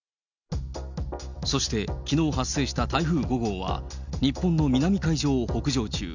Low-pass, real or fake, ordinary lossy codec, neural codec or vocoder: 7.2 kHz; real; none; none